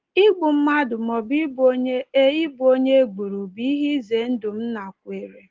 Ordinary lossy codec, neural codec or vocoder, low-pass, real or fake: Opus, 16 kbps; none; 7.2 kHz; real